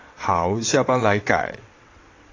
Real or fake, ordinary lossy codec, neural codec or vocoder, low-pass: fake; AAC, 32 kbps; vocoder, 22.05 kHz, 80 mel bands, WaveNeXt; 7.2 kHz